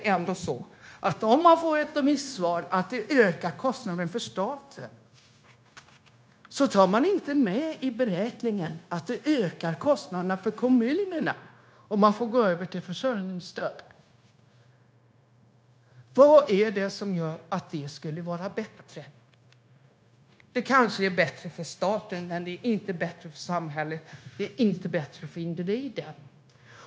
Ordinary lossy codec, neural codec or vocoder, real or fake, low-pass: none; codec, 16 kHz, 0.9 kbps, LongCat-Audio-Codec; fake; none